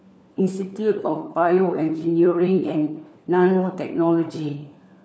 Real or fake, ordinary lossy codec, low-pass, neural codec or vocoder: fake; none; none; codec, 16 kHz, 4 kbps, FunCodec, trained on LibriTTS, 50 frames a second